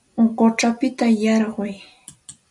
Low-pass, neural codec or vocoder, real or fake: 10.8 kHz; none; real